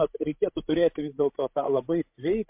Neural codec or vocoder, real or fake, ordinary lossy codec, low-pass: codec, 16 kHz, 16 kbps, FreqCodec, larger model; fake; MP3, 32 kbps; 3.6 kHz